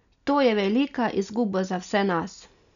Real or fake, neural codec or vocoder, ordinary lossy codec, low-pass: real; none; none; 7.2 kHz